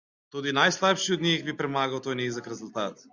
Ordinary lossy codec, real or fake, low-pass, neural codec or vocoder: Opus, 64 kbps; real; 7.2 kHz; none